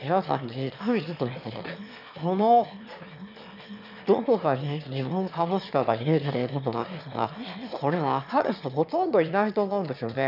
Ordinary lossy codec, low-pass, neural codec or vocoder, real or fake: none; 5.4 kHz; autoencoder, 22.05 kHz, a latent of 192 numbers a frame, VITS, trained on one speaker; fake